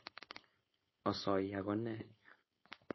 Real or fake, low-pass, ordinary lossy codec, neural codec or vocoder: fake; 7.2 kHz; MP3, 24 kbps; codec, 16 kHz, 4.8 kbps, FACodec